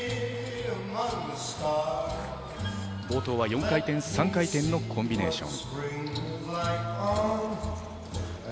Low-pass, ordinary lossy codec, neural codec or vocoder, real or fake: none; none; none; real